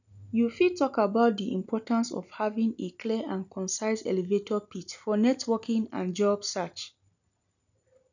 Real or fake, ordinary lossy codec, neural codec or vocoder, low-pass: real; none; none; 7.2 kHz